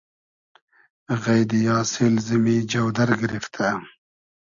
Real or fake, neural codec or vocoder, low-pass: real; none; 7.2 kHz